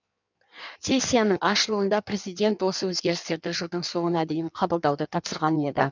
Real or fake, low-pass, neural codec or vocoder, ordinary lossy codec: fake; 7.2 kHz; codec, 16 kHz in and 24 kHz out, 1.1 kbps, FireRedTTS-2 codec; none